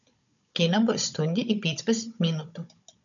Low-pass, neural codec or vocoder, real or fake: 7.2 kHz; codec, 16 kHz, 16 kbps, FunCodec, trained on Chinese and English, 50 frames a second; fake